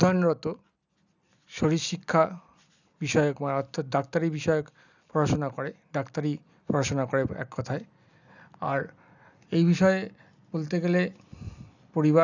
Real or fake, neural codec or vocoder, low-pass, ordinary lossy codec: real; none; 7.2 kHz; none